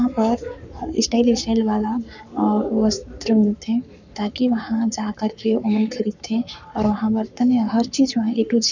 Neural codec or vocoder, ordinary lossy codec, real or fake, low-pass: codec, 44.1 kHz, 7.8 kbps, Pupu-Codec; none; fake; 7.2 kHz